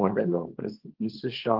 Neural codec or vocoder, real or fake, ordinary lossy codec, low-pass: codec, 24 kHz, 0.9 kbps, WavTokenizer, small release; fake; Opus, 16 kbps; 5.4 kHz